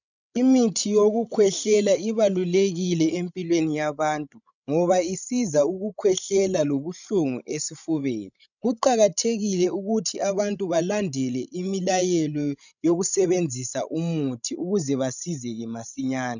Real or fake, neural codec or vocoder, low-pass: fake; codec, 16 kHz, 16 kbps, FreqCodec, larger model; 7.2 kHz